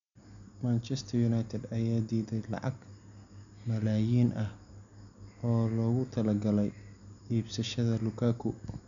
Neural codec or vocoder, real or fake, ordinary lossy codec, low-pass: none; real; none; 7.2 kHz